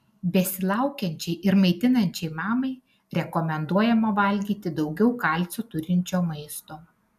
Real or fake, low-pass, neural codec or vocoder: real; 14.4 kHz; none